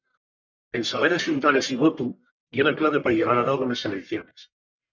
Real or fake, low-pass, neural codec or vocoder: fake; 7.2 kHz; codec, 44.1 kHz, 1.7 kbps, Pupu-Codec